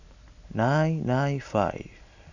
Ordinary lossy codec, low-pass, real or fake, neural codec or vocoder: none; 7.2 kHz; real; none